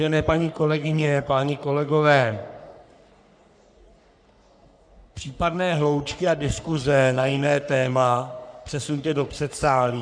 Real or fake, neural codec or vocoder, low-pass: fake; codec, 44.1 kHz, 3.4 kbps, Pupu-Codec; 9.9 kHz